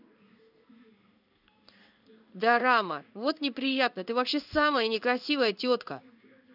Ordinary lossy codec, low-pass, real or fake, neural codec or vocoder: none; 5.4 kHz; fake; codec, 16 kHz in and 24 kHz out, 1 kbps, XY-Tokenizer